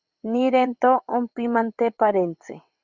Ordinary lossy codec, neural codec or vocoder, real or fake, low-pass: Opus, 64 kbps; vocoder, 22.05 kHz, 80 mel bands, WaveNeXt; fake; 7.2 kHz